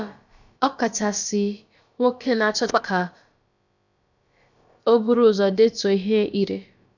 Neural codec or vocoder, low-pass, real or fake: codec, 16 kHz, about 1 kbps, DyCAST, with the encoder's durations; 7.2 kHz; fake